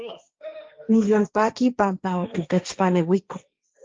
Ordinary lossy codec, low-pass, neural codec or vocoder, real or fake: Opus, 24 kbps; 7.2 kHz; codec, 16 kHz, 1.1 kbps, Voila-Tokenizer; fake